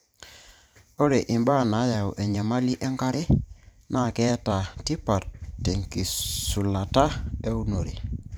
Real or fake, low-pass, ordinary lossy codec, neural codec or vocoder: fake; none; none; vocoder, 44.1 kHz, 128 mel bands every 512 samples, BigVGAN v2